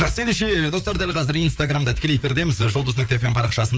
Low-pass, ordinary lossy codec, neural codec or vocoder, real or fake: none; none; codec, 16 kHz, 4 kbps, FreqCodec, larger model; fake